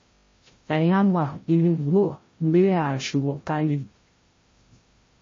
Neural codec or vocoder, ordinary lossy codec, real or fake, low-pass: codec, 16 kHz, 0.5 kbps, FreqCodec, larger model; MP3, 32 kbps; fake; 7.2 kHz